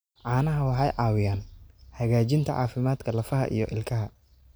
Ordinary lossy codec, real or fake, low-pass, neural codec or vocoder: none; real; none; none